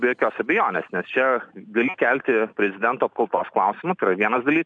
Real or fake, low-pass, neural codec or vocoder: real; 9.9 kHz; none